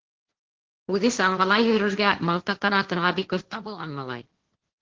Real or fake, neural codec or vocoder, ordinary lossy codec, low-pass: fake; codec, 16 kHz, 1.1 kbps, Voila-Tokenizer; Opus, 16 kbps; 7.2 kHz